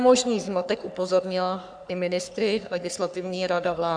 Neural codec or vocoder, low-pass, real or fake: codec, 44.1 kHz, 3.4 kbps, Pupu-Codec; 9.9 kHz; fake